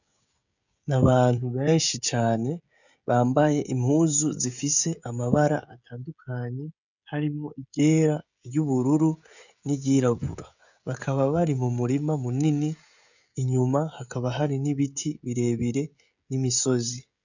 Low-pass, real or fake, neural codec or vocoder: 7.2 kHz; fake; codec, 16 kHz, 16 kbps, FreqCodec, smaller model